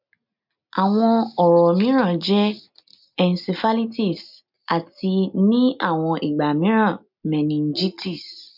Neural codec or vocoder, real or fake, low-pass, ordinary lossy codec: none; real; 5.4 kHz; MP3, 48 kbps